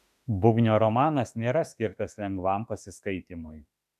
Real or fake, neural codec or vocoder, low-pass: fake; autoencoder, 48 kHz, 32 numbers a frame, DAC-VAE, trained on Japanese speech; 14.4 kHz